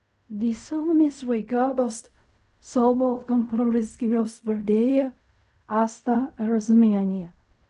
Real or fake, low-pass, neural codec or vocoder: fake; 10.8 kHz; codec, 16 kHz in and 24 kHz out, 0.4 kbps, LongCat-Audio-Codec, fine tuned four codebook decoder